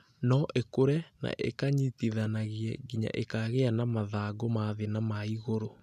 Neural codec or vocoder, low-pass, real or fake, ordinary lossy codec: none; 14.4 kHz; real; none